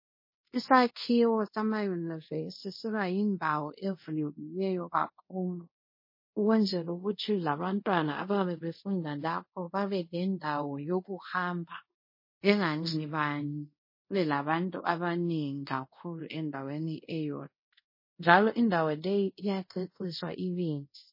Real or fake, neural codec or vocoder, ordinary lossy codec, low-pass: fake; codec, 24 kHz, 0.5 kbps, DualCodec; MP3, 24 kbps; 5.4 kHz